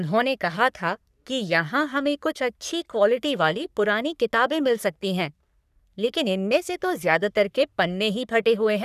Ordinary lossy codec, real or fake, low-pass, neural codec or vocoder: none; fake; 14.4 kHz; codec, 44.1 kHz, 3.4 kbps, Pupu-Codec